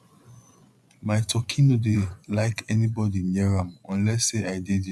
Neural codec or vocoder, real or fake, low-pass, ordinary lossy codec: vocoder, 24 kHz, 100 mel bands, Vocos; fake; none; none